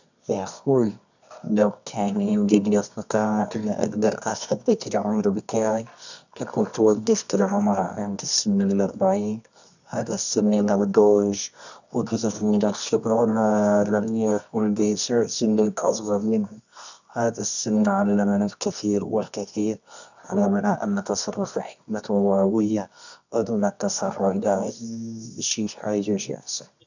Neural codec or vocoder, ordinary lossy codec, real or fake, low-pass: codec, 24 kHz, 0.9 kbps, WavTokenizer, medium music audio release; none; fake; 7.2 kHz